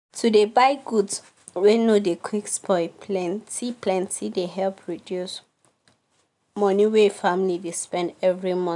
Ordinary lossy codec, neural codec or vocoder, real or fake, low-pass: none; none; real; 10.8 kHz